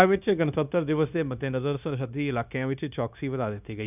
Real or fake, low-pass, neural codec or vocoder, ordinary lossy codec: fake; 3.6 kHz; codec, 16 kHz, 0.9 kbps, LongCat-Audio-Codec; none